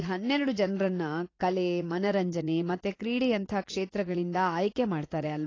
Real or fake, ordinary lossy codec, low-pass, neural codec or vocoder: fake; AAC, 32 kbps; 7.2 kHz; vocoder, 44.1 kHz, 80 mel bands, Vocos